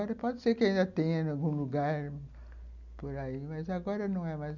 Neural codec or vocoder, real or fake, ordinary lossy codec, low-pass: none; real; none; 7.2 kHz